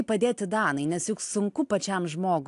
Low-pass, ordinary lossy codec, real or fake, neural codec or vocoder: 10.8 kHz; AAC, 64 kbps; real; none